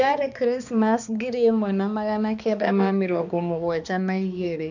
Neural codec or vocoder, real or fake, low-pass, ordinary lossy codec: codec, 16 kHz, 2 kbps, X-Codec, HuBERT features, trained on balanced general audio; fake; 7.2 kHz; none